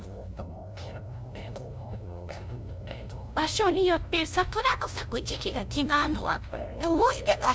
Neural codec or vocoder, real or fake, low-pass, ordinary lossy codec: codec, 16 kHz, 0.5 kbps, FunCodec, trained on LibriTTS, 25 frames a second; fake; none; none